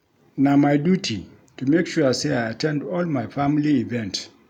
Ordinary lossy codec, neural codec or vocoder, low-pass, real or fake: none; none; 19.8 kHz; real